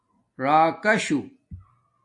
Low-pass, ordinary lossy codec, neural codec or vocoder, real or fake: 10.8 kHz; AAC, 64 kbps; none; real